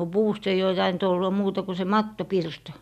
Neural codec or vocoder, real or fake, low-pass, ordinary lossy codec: none; real; 14.4 kHz; none